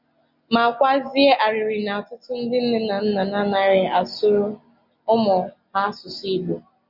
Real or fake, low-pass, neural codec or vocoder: real; 5.4 kHz; none